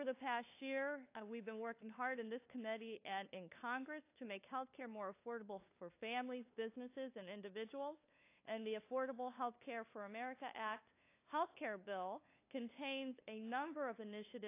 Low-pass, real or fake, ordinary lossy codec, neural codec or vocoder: 3.6 kHz; fake; AAC, 24 kbps; codec, 16 kHz, 2 kbps, FunCodec, trained on LibriTTS, 25 frames a second